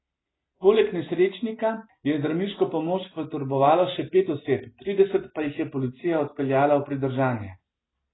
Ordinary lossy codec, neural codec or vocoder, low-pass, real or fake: AAC, 16 kbps; none; 7.2 kHz; real